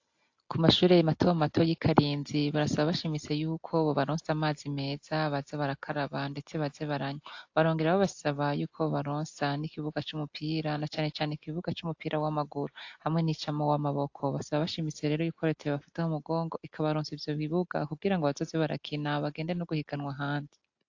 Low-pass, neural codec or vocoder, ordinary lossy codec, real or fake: 7.2 kHz; none; AAC, 48 kbps; real